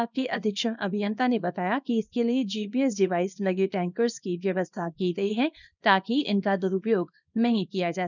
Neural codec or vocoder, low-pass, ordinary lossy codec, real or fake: codec, 24 kHz, 0.9 kbps, WavTokenizer, small release; 7.2 kHz; none; fake